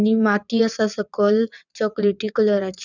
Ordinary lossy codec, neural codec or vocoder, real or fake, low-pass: none; vocoder, 22.05 kHz, 80 mel bands, WaveNeXt; fake; 7.2 kHz